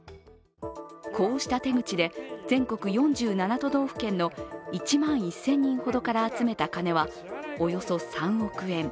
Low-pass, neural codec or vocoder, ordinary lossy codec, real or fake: none; none; none; real